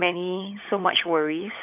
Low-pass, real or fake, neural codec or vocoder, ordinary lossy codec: 3.6 kHz; fake; codec, 16 kHz, 16 kbps, FunCodec, trained on LibriTTS, 50 frames a second; none